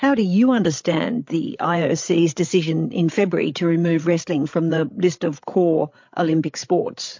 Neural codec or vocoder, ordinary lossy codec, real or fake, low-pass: codec, 16 kHz, 8 kbps, FreqCodec, larger model; MP3, 48 kbps; fake; 7.2 kHz